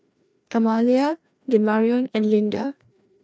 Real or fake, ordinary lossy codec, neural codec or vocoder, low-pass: fake; none; codec, 16 kHz, 1 kbps, FreqCodec, larger model; none